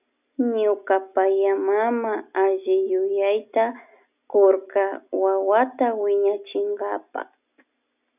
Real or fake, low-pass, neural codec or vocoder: real; 3.6 kHz; none